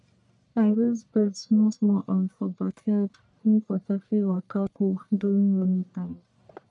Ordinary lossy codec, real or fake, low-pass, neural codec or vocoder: none; fake; 10.8 kHz; codec, 44.1 kHz, 1.7 kbps, Pupu-Codec